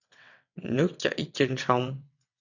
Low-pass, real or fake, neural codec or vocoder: 7.2 kHz; fake; vocoder, 22.05 kHz, 80 mel bands, WaveNeXt